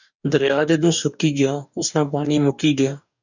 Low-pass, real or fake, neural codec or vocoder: 7.2 kHz; fake; codec, 44.1 kHz, 2.6 kbps, DAC